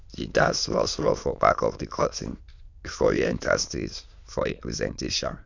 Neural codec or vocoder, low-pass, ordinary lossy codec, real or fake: autoencoder, 22.05 kHz, a latent of 192 numbers a frame, VITS, trained on many speakers; 7.2 kHz; none; fake